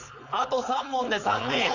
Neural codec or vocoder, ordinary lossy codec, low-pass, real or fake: codec, 16 kHz, 4.8 kbps, FACodec; none; 7.2 kHz; fake